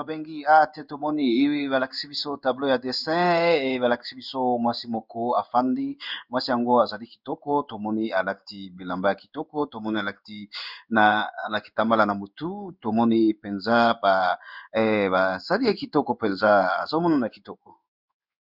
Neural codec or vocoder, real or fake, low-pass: codec, 16 kHz in and 24 kHz out, 1 kbps, XY-Tokenizer; fake; 5.4 kHz